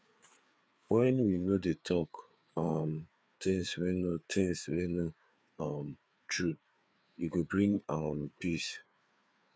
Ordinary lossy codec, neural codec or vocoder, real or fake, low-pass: none; codec, 16 kHz, 4 kbps, FreqCodec, larger model; fake; none